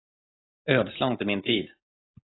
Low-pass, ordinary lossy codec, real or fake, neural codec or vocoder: 7.2 kHz; AAC, 16 kbps; real; none